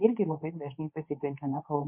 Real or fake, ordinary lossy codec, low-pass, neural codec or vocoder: fake; MP3, 32 kbps; 3.6 kHz; codec, 24 kHz, 0.9 kbps, WavTokenizer, medium speech release version 2